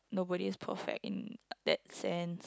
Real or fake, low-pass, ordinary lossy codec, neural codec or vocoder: real; none; none; none